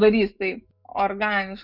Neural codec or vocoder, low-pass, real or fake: autoencoder, 48 kHz, 128 numbers a frame, DAC-VAE, trained on Japanese speech; 5.4 kHz; fake